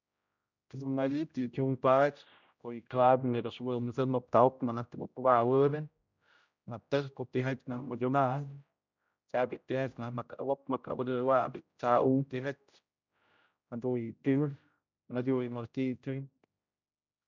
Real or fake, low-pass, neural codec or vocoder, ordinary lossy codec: fake; 7.2 kHz; codec, 16 kHz, 0.5 kbps, X-Codec, HuBERT features, trained on general audio; none